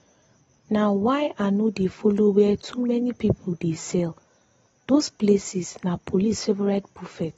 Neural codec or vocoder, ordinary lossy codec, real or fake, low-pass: none; AAC, 24 kbps; real; 19.8 kHz